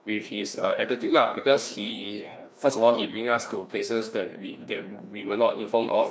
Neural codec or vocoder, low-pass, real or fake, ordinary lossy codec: codec, 16 kHz, 1 kbps, FreqCodec, larger model; none; fake; none